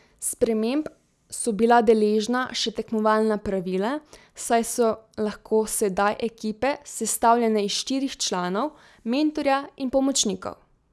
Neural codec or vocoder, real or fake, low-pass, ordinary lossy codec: none; real; none; none